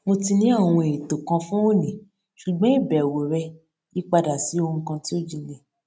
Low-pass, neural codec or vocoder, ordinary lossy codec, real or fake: none; none; none; real